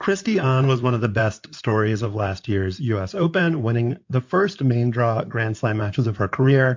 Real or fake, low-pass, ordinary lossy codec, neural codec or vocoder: fake; 7.2 kHz; MP3, 48 kbps; codec, 16 kHz in and 24 kHz out, 2.2 kbps, FireRedTTS-2 codec